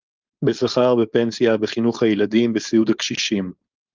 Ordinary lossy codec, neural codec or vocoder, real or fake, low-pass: Opus, 24 kbps; codec, 16 kHz, 4.8 kbps, FACodec; fake; 7.2 kHz